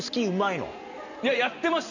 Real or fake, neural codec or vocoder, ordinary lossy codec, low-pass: real; none; none; 7.2 kHz